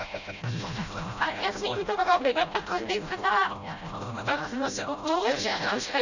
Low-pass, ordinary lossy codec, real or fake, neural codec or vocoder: 7.2 kHz; none; fake; codec, 16 kHz, 0.5 kbps, FreqCodec, smaller model